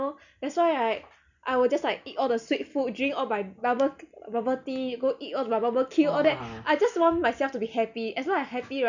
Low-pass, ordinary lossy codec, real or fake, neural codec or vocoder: 7.2 kHz; none; real; none